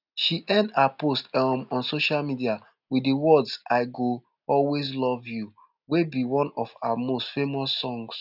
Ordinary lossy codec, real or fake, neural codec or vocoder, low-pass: none; real; none; 5.4 kHz